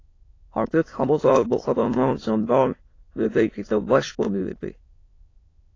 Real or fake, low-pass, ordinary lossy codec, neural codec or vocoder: fake; 7.2 kHz; AAC, 32 kbps; autoencoder, 22.05 kHz, a latent of 192 numbers a frame, VITS, trained on many speakers